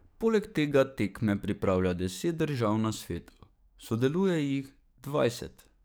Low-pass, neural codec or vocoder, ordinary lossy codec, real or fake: none; codec, 44.1 kHz, 7.8 kbps, DAC; none; fake